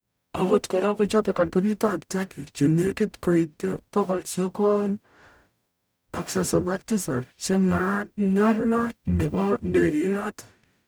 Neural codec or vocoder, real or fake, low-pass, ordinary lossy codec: codec, 44.1 kHz, 0.9 kbps, DAC; fake; none; none